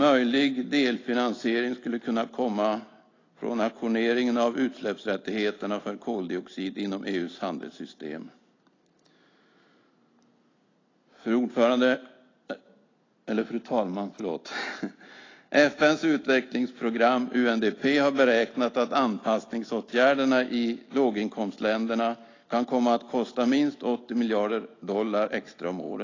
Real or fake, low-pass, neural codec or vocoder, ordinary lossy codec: real; 7.2 kHz; none; AAC, 32 kbps